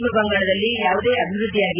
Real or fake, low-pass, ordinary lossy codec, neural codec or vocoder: real; 3.6 kHz; none; none